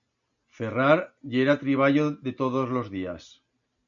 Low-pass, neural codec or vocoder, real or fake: 7.2 kHz; none; real